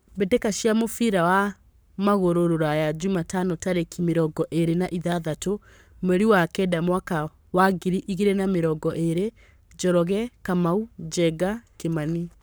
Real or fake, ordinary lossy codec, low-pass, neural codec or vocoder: fake; none; none; codec, 44.1 kHz, 7.8 kbps, Pupu-Codec